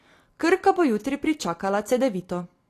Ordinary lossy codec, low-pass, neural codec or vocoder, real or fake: AAC, 48 kbps; 14.4 kHz; none; real